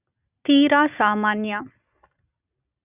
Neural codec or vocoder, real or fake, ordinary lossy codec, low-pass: none; real; none; 3.6 kHz